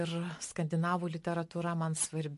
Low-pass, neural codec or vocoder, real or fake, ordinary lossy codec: 14.4 kHz; none; real; MP3, 48 kbps